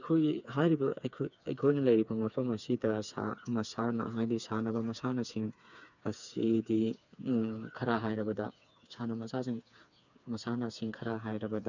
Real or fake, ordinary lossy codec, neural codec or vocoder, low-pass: fake; none; codec, 16 kHz, 4 kbps, FreqCodec, smaller model; 7.2 kHz